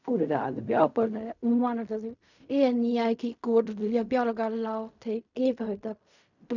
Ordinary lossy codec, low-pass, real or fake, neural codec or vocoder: none; 7.2 kHz; fake; codec, 16 kHz in and 24 kHz out, 0.4 kbps, LongCat-Audio-Codec, fine tuned four codebook decoder